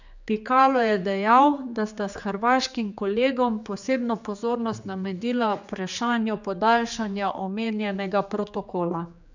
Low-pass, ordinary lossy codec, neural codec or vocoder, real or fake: 7.2 kHz; none; codec, 16 kHz, 4 kbps, X-Codec, HuBERT features, trained on general audio; fake